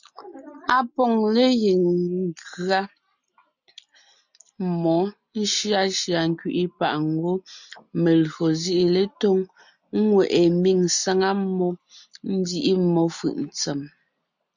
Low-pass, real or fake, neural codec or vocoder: 7.2 kHz; real; none